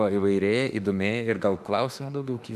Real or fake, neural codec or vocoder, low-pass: fake; autoencoder, 48 kHz, 32 numbers a frame, DAC-VAE, trained on Japanese speech; 14.4 kHz